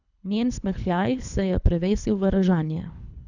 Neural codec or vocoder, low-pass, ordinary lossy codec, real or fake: codec, 24 kHz, 3 kbps, HILCodec; 7.2 kHz; none; fake